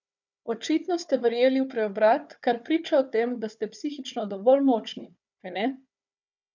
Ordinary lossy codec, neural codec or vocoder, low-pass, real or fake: none; codec, 16 kHz, 4 kbps, FunCodec, trained on Chinese and English, 50 frames a second; 7.2 kHz; fake